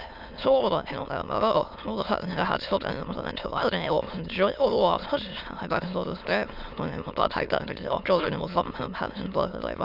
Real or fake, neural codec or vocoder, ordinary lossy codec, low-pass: fake; autoencoder, 22.05 kHz, a latent of 192 numbers a frame, VITS, trained on many speakers; none; 5.4 kHz